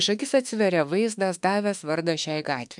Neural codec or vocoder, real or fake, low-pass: autoencoder, 48 kHz, 32 numbers a frame, DAC-VAE, trained on Japanese speech; fake; 10.8 kHz